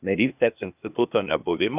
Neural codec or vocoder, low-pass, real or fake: codec, 16 kHz, 0.8 kbps, ZipCodec; 3.6 kHz; fake